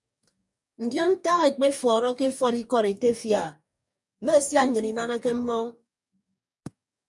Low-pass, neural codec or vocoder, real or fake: 10.8 kHz; codec, 44.1 kHz, 2.6 kbps, DAC; fake